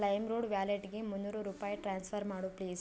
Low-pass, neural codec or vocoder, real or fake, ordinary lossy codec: none; none; real; none